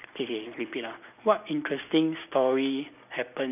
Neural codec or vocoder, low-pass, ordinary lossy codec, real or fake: none; 3.6 kHz; none; real